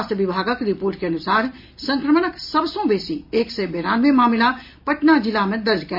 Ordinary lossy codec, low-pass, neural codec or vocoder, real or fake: MP3, 48 kbps; 5.4 kHz; none; real